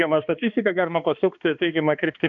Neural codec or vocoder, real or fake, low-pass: codec, 16 kHz, 2 kbps, X-Codec, HuBERT features, trained on balanced general audio; fake; 7.2 kHz